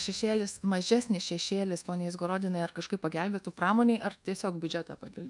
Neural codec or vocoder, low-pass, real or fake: codec, 24 kHz, 1.2 kbps, DualCodec; 10.8 kHz; fake